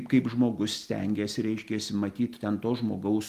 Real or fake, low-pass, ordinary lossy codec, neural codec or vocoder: real; 14.4 kHz; Opus, 24 kbps; none